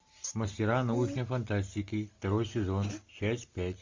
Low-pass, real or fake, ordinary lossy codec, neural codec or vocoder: 7.2 kHz; real; MP3, 48 kbps; none